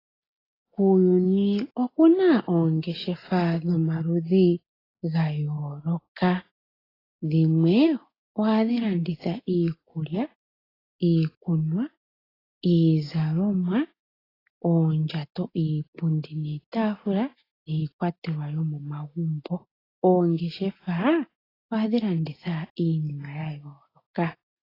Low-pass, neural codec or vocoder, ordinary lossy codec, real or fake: 5.4 kHz; none; AAC, 24 kbps; real